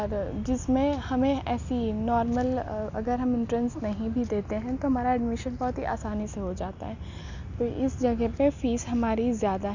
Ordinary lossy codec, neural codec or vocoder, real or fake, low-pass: none; none; real; 7.2 kHz